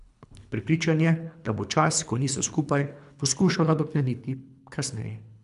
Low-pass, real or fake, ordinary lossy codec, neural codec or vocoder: 10.8 kHz; fake; none; codec, 24 kHz, 3 kbps, HILCodec